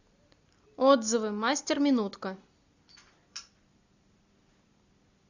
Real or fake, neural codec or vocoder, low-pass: real; none; 7.2 kHz